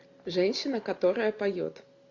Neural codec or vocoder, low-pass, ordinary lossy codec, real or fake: none; 7.2 kHz; AAC, 48 kbps; real